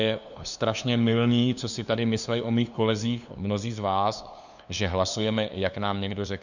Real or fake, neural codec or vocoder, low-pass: fake; codec, 16 kHz, 2 kbps, FunCodec, trained on LibriTTS, 25 frames a second; 7.2 kHz